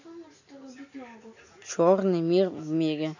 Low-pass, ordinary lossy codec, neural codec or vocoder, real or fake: 7.2 kHz; none; none; real